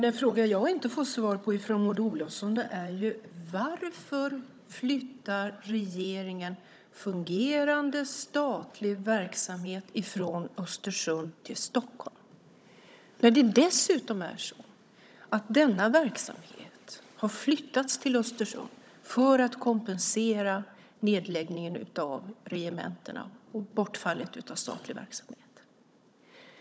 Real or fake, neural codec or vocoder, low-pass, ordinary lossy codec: fake; codec, 16 kHz, 16 kbps, FunCodec, trained on Chinese and English, 50 frames a second; none; none